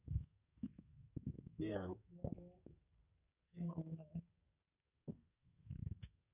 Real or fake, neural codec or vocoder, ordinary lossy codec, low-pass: fake; codec, 44.1 kHz, 2.6 kbps, SNAC; none; 3.6 kHz